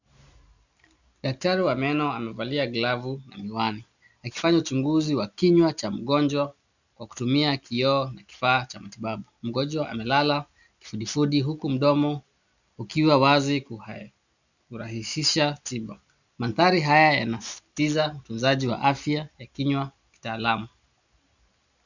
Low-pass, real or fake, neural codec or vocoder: 7.2 kHz; real; none